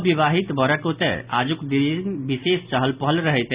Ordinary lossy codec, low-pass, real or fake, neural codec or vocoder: Opus, 64 kbps; 3.6 kHz; real; none